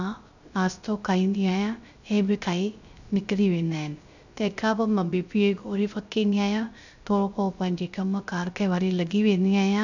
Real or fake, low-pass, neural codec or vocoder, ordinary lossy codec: fake; 7.2 kHz; codec, 16 kHz, 0.3 kbps, FocalCodec; none